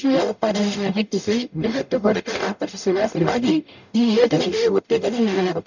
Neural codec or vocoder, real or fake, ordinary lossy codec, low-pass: codec, 44.1 kHz, 0.9 kbps, DAC; fake; none; 7.2 kHz